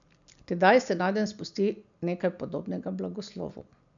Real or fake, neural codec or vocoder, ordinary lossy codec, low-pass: real; none; none; 7.2 kHz